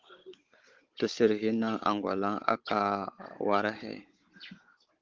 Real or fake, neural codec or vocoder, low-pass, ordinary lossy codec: fake; codec, 16 kHz, 16 kbps, FunCodec, trained on Chinese and English, 50 frames a second; 7.2 kHz; Opus, 16 kbps